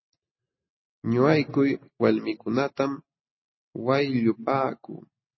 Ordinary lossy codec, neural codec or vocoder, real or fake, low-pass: MP3, 24 kbps; none; real; 7.2 kHz